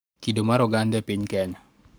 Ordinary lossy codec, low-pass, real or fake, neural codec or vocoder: none; none; fake; codec, 44.1 kHz, 7.8 kbps, Pupu-Codec